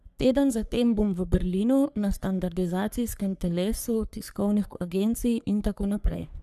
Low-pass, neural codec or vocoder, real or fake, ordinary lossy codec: 14.4 kHz; codec, 44.1 kHz, 3.4 kbps, Pupu-Codec; fake; none